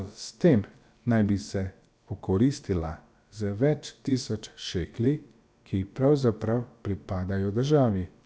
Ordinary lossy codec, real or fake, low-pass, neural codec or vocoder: none; fake; none; codec, 16 kHz, about 1 kbps, DyCAST, with the encoder's durations